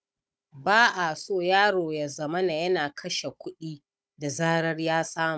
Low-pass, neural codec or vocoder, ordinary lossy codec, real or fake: none; codec, 16 kHz, 16 kbps, FunCodec, trained on Chinese and English, 50 frames a second; none; fake